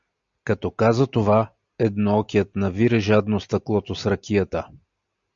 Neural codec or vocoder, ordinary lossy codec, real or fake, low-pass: none; MP3, 64 kbps; real; 7.2 kHz